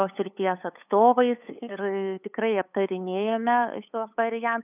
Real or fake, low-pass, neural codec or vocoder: fake; 3.6 kHz; codec, 16 kHz, 8 kbps, FunCodec, trained on LibriTTS, 25 frames a second